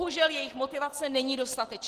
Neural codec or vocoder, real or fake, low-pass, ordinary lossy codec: none; real; 14.4 kHz; Opus, 16 kbps